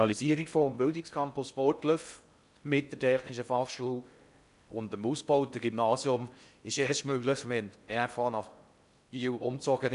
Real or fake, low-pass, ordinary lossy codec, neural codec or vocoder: fake; 10.8 kHz; none; codec, 16 kHz in and 24 kHz out, 0.6 kbps, FocalCodec, streaming, 4096 codes